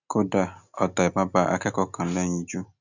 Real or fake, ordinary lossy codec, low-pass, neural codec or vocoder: real; none; 7.2 kHz; none